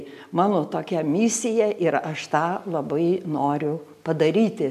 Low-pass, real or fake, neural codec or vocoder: 14.4 kHz; real; none